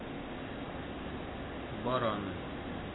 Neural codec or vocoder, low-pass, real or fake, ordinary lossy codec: none; 7.2 kHz; real; AAC, 16 kbps